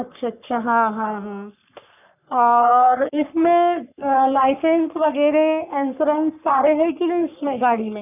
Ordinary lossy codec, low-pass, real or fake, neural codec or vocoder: none; 3.6 kHz; fake; codec, 44.1 kHz, 3.4 kbps, Pupu-Codec